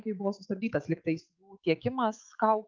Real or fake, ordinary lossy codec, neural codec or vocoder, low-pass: fake; Opus, 24 kbps; autoencoder, 48 kHz, 128 numbers a frame, DAC-VAE, trained on Japanese speech; 7.2 kHz